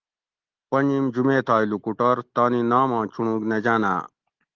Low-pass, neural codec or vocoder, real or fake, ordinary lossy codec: 7.2 kHz; none; real; Opus, 16 kbps